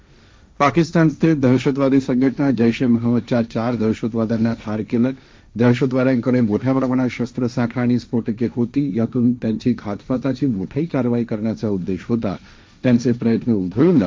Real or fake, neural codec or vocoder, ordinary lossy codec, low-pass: fake; codec, 16 kHz, 1.1 kbps, Voila-Tokenizer; none; none